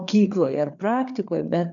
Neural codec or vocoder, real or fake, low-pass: codec, 16 kHz, 4 kbps, FreqCodec, larger model; fake; 7.2 kHz